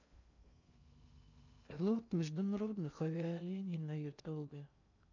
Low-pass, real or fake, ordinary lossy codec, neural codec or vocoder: 7.2 kHz; fake; none; codec, 16 kHz in and 24 kHz out, 0.6 kbps, FocalCodec, streaming, 2048 codes